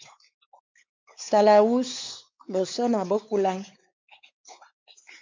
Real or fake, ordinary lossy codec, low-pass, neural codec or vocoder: fake; MP3, 64 kbps; 7.2 kHz; codec, 16 kHz, 4 kbps, X-Codec, WavLM features, trained on Multilingual LibriSpeech